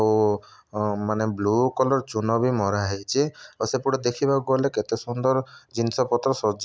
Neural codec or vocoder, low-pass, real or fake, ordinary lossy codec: none; none; real; none